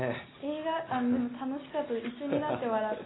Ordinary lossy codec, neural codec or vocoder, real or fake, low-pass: AAC, 16 kbps; none; real; 7.2 kHz